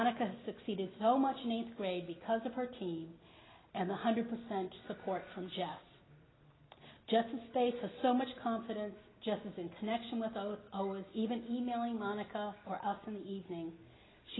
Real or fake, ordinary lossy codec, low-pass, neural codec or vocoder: real; AAC, 16 kbps; 7.2 kHz; none